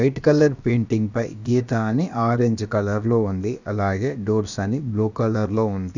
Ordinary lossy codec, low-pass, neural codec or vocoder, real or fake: none; 7.2 kHz; codec, 16 kHz, about 1 kbps, DyCAST, with the encoder's durations; fake